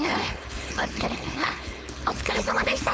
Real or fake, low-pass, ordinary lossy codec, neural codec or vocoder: fake; none; none; codec, 16 kHz, 4.8 kbps, FACodec